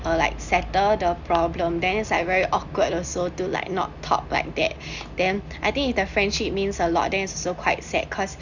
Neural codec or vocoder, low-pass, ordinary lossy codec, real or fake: none; 7.2 kHz; none; real